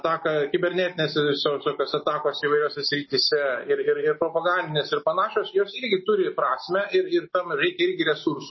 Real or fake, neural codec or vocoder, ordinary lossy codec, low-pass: real; none; MP3, 24 kbps; 7.2 kHz